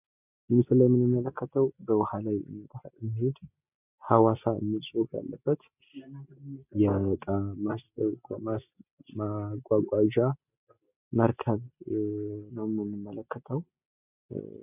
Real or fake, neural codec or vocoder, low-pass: real; none; 3.6 kHz